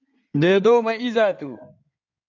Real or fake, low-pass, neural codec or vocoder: fake; 7.2 kHz; codec, 16 kHz in and 24 kHz out, 2.2 kbps, FireRedTTS-2 codec